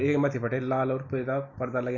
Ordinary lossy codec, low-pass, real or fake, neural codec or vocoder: none; 7.2 kHz; real; none